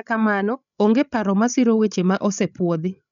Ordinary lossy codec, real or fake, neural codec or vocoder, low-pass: none; fake; codec, 16 kHz, 8 kbps, FreqCodec, larger model; 7.2 kHz